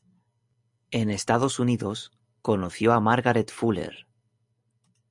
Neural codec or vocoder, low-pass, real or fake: none; 10.8 kHz; real